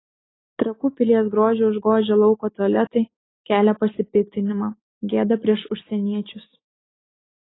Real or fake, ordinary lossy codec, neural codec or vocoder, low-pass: real; AAC, 16 kbps; none; 7.2 kHz